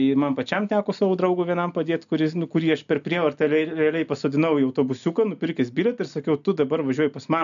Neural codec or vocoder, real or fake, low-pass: none; real; 7.2 kHz